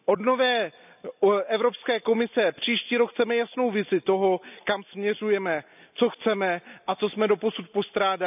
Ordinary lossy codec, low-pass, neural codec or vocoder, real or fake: none; 3.6 kHz; none; real